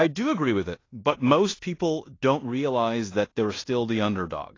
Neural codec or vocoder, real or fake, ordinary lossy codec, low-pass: codec, 16 kHz in and 24 kHz out, 0.9 kbps, LongCat-Audio-Codec, fine tuned four codebook decoder; fake; AAC, 32 kbps; 7.2 kHz